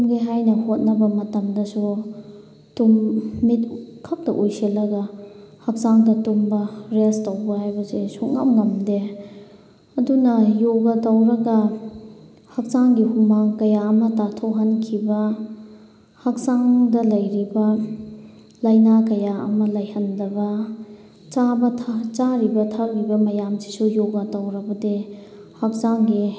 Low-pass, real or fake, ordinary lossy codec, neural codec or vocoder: none; real; none; none